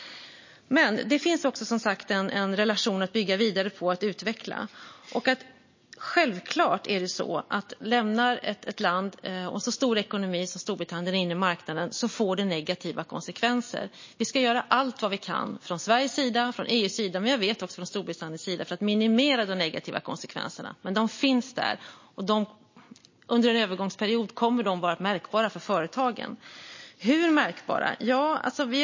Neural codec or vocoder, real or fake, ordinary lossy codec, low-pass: none; real; MP3, 32 kbps; 7.2 kHz